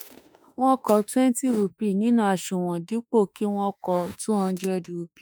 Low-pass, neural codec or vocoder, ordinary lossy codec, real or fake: none; autoencoder, 48 kHz, 32 numbers a frame, DAC-VAE, trained on Japanese speech; none; fake